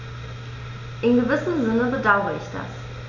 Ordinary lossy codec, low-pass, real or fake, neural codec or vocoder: none; 7.2 kHz; real; none